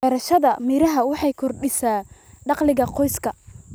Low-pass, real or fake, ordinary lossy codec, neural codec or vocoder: none; real; none; none